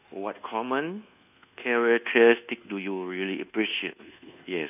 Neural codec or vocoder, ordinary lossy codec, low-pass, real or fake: codec, 24 kHz, 1.2 kbps, DualCodec; none; 3.6 kHz; fake